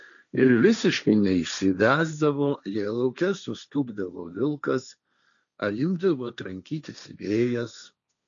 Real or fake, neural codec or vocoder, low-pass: fake; codec, 16 kHz, 1.1 kbps, Voila-Tokenizer; 7.2 kHz